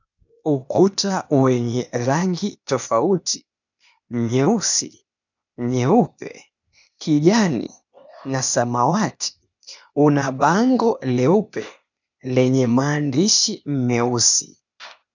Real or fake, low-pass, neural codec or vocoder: fake; 7.2 kHz; codec, 16 kHz, 0.8 kbps, ZipCodec